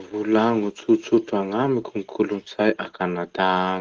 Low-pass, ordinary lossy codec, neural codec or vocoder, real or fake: 7.2 kHz; Opus, 16 kbps; none; real